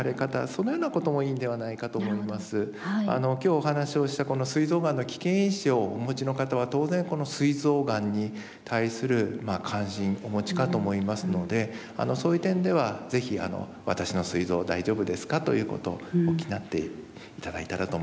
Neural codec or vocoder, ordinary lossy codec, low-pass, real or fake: none; none; none; real